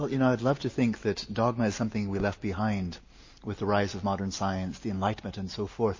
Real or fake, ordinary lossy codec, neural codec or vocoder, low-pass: real; MP3, 32 kbps; none; 7.2 kHz